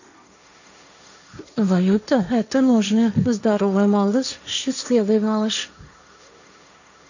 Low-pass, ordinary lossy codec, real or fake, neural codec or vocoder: 7.2 kHz; none; fake; codec, 16 kHz, 1.1 kbps, Voila-Tokenizer